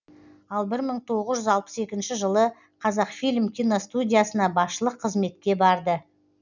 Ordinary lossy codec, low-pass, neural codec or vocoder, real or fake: none; 7.2 kHz; none; real